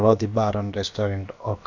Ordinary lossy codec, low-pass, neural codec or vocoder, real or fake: none; 7.2 kHz; codec, 16 kHz, about 1 kbps, DyCAST, with the encoder's durations; fake